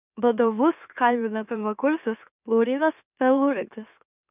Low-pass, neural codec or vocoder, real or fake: 3.6 kHz; autoencoder, 44.1 kHz, a latent of 192 numbers a frame, MeloTTS; fake